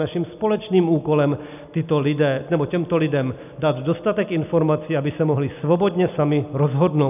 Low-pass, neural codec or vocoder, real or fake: 3.6 kHz; none; real